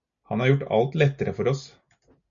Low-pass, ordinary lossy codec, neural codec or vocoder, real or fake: 7.2 kHz; MP3, 64 kbps; none; real